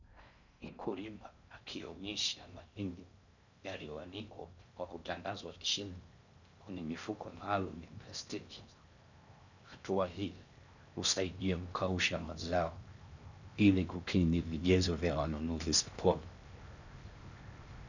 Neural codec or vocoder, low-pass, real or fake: codec, 16 kHz in and 24 kHz out, 0.6 kbps, FocalCodec, streaming, 4096 codes; 7.2 kHz; fake